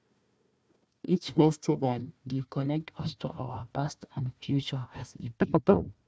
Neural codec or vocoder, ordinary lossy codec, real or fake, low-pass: codec, 16 kHz, 1 kbps, FunCodec, trained on Chinese and English, 50 frames a second; none; fake; none